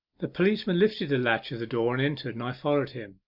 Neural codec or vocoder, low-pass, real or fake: none; 5.4 kHz; real